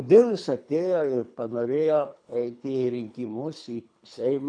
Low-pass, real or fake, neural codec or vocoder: 9.9 kHz; fake; codec, 24 kHz, 3 kbps, HILCodec